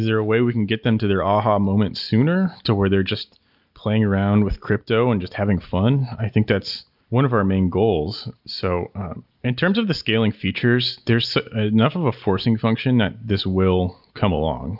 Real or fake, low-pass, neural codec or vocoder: real; 5.4 kHz; none